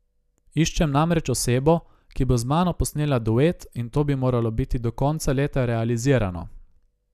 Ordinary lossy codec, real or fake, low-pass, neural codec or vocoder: none; real; 14.4 kHz; none